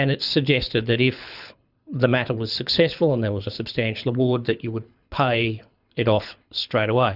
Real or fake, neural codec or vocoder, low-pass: fake; codec, 24 kHz, 6 kbps, HILCodec; 5.4 kHz